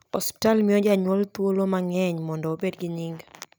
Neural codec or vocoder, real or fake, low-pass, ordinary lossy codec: none; real; none; none